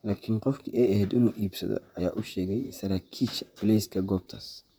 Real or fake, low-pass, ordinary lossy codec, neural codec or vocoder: fake; none; none; vocoder, 44.1 kHz, 128 mel bands, Pupu-Vocoder